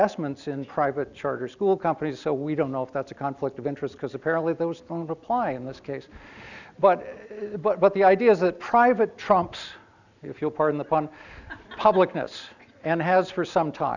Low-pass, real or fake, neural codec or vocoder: 7.2 kHz; real; none